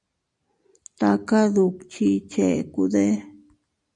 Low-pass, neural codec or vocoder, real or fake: 10.8 kHz; none; real